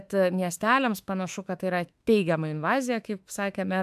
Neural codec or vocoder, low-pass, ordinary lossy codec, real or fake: autoencoder, 48 kHz, 32 numbers a frame, DAC-VAE, trained on Japanese speech; 14.4 kHz; AAC, 96 kbps; fake